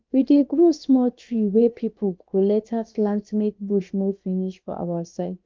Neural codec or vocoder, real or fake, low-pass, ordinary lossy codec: codec, 16 kHz, about 1 kbps, DyCAST, with the encoder's durations; fake; 7.2 kHz; Opus, 24 kbps